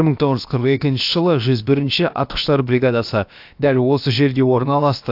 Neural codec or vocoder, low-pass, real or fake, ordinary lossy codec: codec, 16 kHz, about 1 kbps, DyCAST, with the encoder's durations; 5.4 kHz; fake; AAC, 48 kbps